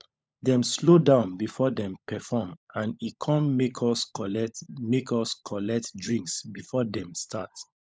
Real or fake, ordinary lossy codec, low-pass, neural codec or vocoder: fake; none; none; codec, 16 kHz, 16 kbps, FunCodec, trained on LibriTTS, 50 frames a second